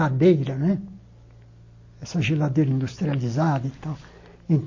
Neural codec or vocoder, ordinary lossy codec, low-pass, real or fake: none; MP3, 32 kbps; 7.2 kHz; real